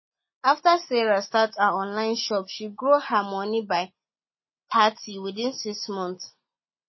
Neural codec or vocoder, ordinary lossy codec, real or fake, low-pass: none; MP3, 24 kbps; real; 7.2 kHz